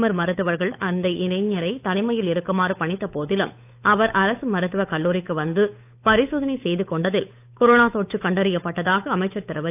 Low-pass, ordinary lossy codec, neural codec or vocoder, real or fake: 3.6 kHz; AAC, 32 kbps; codec, 16 kHz, 8 kbps, FunCodec, trained on Chinese and English, 25 frames a second; fake